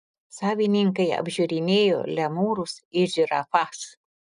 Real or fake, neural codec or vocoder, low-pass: real; none; 10.8 kHz